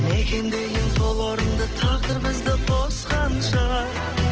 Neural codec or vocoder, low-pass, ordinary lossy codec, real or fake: none; 7.2 kHz; Opus, 16 kbps; real